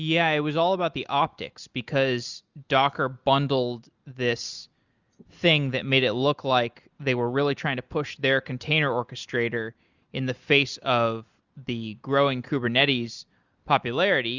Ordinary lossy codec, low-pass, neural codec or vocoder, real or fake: Opus, 64 kbps; 7.2 kHz; none; real